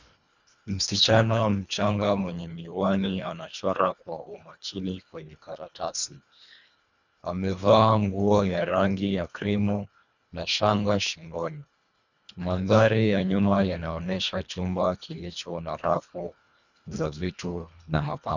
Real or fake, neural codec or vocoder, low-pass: fake; codec, 24 kHz, 1.5 kbps, HILCodec; 7.2 kHz